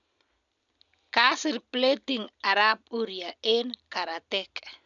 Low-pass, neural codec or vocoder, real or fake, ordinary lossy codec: 7.2 kHz; none; real; none